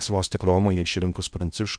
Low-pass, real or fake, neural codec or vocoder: 9.9 kHz; fake; codec, 16 kHz in and 24 kHz out, 0.8 kbps, FocalCodec, streaming, 65536 codes